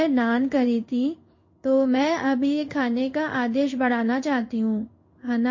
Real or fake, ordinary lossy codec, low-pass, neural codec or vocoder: fake; MP3, 32 kbps; 7.2 kHz; codec, 16 kHz in and 24 kHz out, 1 kbps, XY-Tokenizer